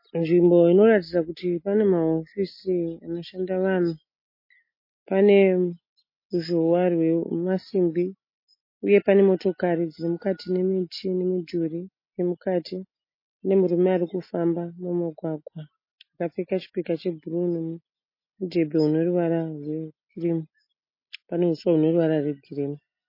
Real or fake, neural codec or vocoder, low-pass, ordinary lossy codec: real; none; 5.4 kHz; MP3, 24 kbps